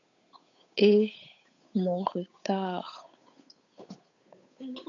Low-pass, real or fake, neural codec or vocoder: 7.2 kHz; fake; codec, 16 kHz, 8 kbps, FunCodec, trained on Chinese and English, 25 frames a second